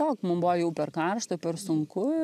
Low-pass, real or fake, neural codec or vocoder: 14.4 kHz; real; none